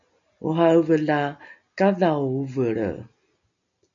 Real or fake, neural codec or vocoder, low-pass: real; none; 7.2 kHz